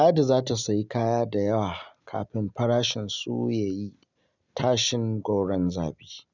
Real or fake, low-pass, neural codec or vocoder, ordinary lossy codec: real; 7.2 kHz; none; none